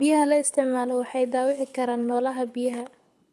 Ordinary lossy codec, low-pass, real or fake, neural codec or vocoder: none; none; fake; codec, 24 kHz, 6 kbps, HILCodec